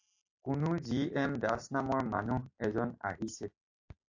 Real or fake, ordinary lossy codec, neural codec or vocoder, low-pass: real; AAC, 48 kbps; none; 7.2 kHz